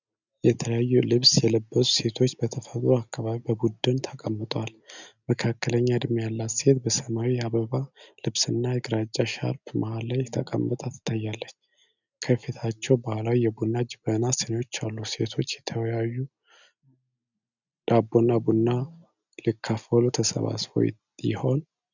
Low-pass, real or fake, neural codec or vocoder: 7.2 kHz; real; none